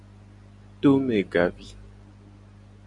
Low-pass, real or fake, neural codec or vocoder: 10.8 kHz; real; none